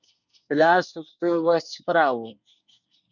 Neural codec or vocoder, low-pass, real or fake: codec, 24 kHz, 1 kbps, SNAC; 7.2 kHz; fake